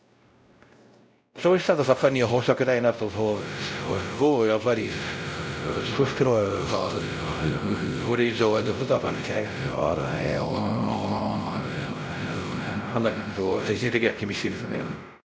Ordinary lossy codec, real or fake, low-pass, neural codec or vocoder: none; fake; none; codec, 16 kHz, 0.5 kbps, X-Codec, WavLM features, trained on Multilingual LibriSpeech